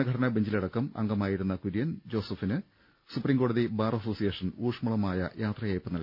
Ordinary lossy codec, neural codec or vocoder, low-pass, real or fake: none; none; 5.4 kHz; real